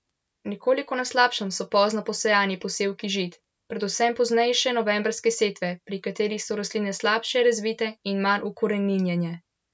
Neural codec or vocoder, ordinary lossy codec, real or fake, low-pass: none; none; real; none